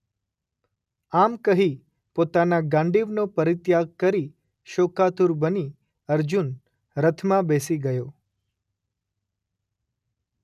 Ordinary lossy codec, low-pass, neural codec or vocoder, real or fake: none; 14.4 kHz; none; real